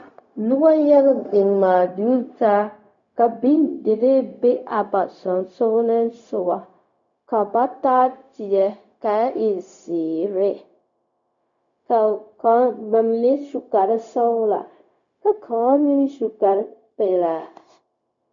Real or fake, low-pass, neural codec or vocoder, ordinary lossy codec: fake; 7.2 kHz; codec, 16 kHz, 0.4 kbps, LongCat-Audio-Codec; MP3, 64 kbps